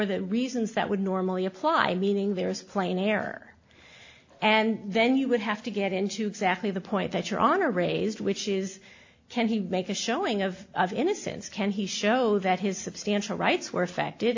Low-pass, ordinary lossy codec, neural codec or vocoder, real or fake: 7.2 kHz; AAC, 48 kbps; none; real